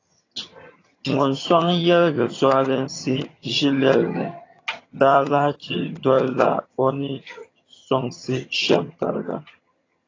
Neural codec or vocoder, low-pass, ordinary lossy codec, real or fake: vocoder, 22.05 kHz, 80 mel bands, HiFi-GAN; 7.2 kHz; AAC, 32 kbps; fake